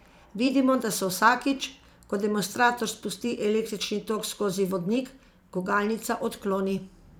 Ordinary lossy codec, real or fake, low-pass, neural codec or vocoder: none; fake; none; vocoder, 44.1 kHz, 128 mel bands every 512 samples, BigVGAN v2